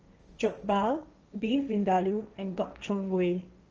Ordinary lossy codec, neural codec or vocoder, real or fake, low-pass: Opus, 24 kbps; codec, 16 kHz, 1.1 kbps, Voila-Tokenizer; fake; 7.2 kHz